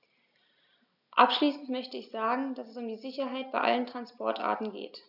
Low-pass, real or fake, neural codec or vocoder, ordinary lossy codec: 5.4 kHz; real; none; none